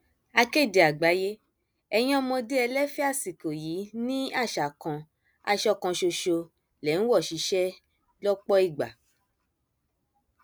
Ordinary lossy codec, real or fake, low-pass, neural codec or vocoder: none; real; none; none